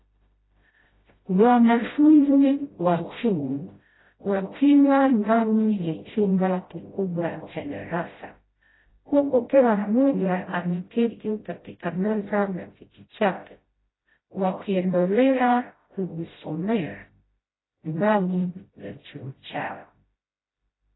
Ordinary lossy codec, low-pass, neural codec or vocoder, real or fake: AAC, 16 kbps; 7.2 kHz; codec, 16 kHz, 0.5 kbps, FreqCodec, smaller model; fake